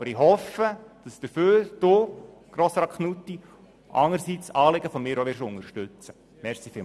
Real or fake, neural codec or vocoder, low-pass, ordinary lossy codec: real; none; none; none